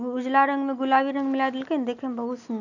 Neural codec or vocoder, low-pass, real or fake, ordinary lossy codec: none; 7.2 kHz; real; none